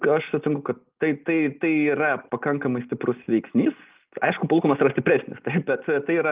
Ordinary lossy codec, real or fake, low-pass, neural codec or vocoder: Opus, 24 kbps; real; 3.6 kHz; none